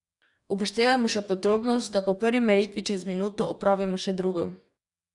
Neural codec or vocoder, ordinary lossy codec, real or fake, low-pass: codec, 44.1 kHz, 2.6 kbps, DAC; none; fake; 10.8 kHz